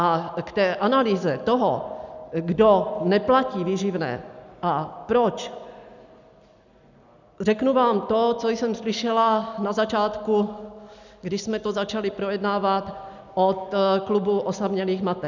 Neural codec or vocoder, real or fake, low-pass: none; real; 7.2 kHz